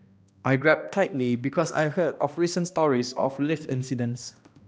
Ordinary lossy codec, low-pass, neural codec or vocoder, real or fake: none; none; codec, 16 kHz, 1 kbps, X-Codec, HuBERT features, trained on balanced general audio; fake